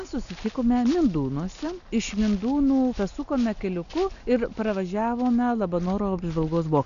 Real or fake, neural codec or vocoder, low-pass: real; none; 7.2 kHz